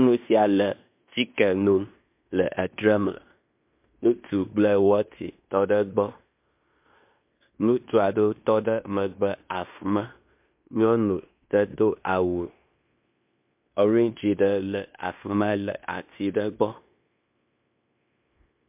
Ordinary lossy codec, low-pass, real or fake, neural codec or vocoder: MP3, 32 kbps; 3.6 kHz; fake; codec, 16 kHz in and 24 kHz out, 0.9 kbps, LongCat-Audio-Codec, fine tuned four codebook decoder